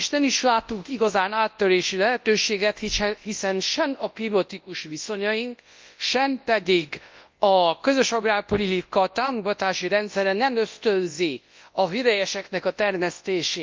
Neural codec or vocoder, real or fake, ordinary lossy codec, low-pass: codec, 24 kHz, 0.9 kbps, WavTokenizer, large speech release; fake; Opus, 24 kbps; 7.2 kHz